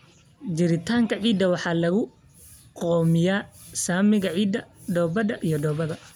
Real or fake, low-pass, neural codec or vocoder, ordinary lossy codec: real; none; none; none